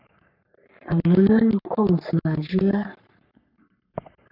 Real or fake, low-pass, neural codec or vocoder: fake; 5.4 kHz; codec, 44.1 kHz, 3.4 kbps, Pupu-Codec